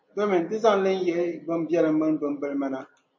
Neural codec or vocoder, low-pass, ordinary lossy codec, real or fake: none; 7.2 kHz; MP3, 48 kbps; real